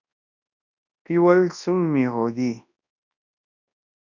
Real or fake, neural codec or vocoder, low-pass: fake; codec, 24 kHz, 0.9 kbps, WavTokenizer, large speech release; 7.2 kHz